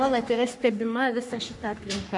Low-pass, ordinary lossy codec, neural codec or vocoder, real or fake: 10.8 kHz; MP3, 64 kbps; codec, 44.1 kHz, 3.4 kbps, Pupu-Codec; fake